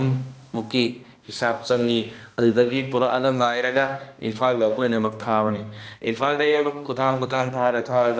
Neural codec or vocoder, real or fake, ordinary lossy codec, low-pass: codec, 16 kHz, 1 kbps, X-Codec, HuBERT features, trained on balanced general audio; fake; none; none